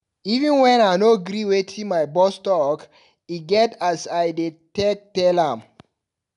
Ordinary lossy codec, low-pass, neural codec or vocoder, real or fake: none; 10.8 kHz; none; real